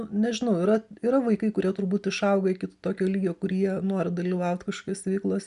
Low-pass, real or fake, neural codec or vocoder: 10.8 kHz; real; none